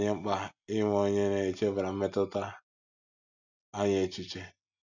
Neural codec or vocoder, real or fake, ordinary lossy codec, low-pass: none; real; none; 7.2 kHz